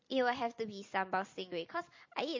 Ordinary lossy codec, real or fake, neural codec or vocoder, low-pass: MP3, 32 kbps; fake; vocoder, 44.1 kHz, 128 mel bands every 256 samples, BigVGAN v2; 7.2 kHz